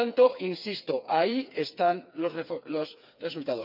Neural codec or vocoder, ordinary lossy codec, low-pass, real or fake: codec, 16 kHz, 4 kbps, FreqCodec, smaller model; none; 5.4 kHz; fake